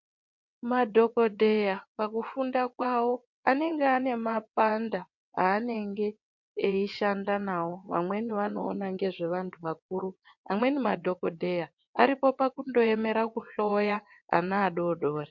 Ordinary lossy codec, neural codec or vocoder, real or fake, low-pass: MP3, 48 kbps; vocoder, 22.05 kHz, 80 mel bands, WaveNeXt; fake; 7.2 kHz